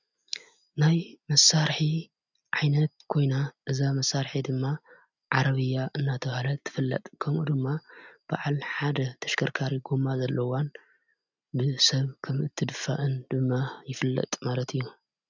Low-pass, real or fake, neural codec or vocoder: 7.2 kHz; real; none